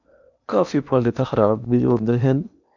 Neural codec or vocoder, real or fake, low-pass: codec, 16 kHz in and 24 kHz out, 0.8 kbps, FocalCodec, streaming, 65536 codes; fake; 7.2 kHz